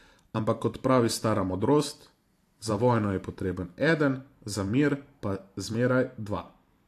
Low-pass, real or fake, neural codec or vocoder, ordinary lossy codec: 14.4 kHz; fake; vocoder, 44.1 kHz, 128 mel bands every 512 samples, BigVGAN v2; AAC, 64 kbps